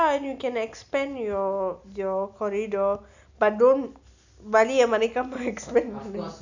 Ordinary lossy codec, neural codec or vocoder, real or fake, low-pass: none; none; real; 7.2 kHz